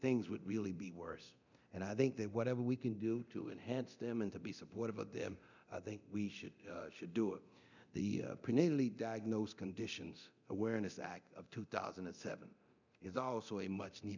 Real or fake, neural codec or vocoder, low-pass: fake; codec, 24 kHz, 0.9 kbps, DualCodec; 7.2 kHz